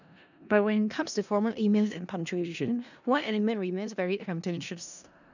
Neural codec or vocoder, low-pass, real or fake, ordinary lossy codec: codec, 16 kHz in and 24 kHz out, 0.4 kbps, LongCat-Audio-Codec, four codebook decoder; 7.2 kHz; fake; none